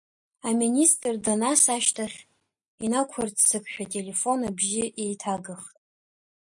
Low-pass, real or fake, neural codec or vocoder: 10.8 kHz; real; none